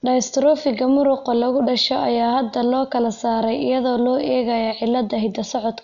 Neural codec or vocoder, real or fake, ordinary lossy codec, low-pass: none; real; none; 7.2 kHz